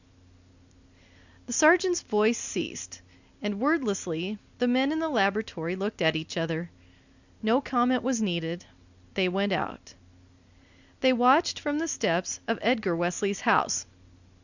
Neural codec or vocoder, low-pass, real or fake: none; 7.2 kHz; real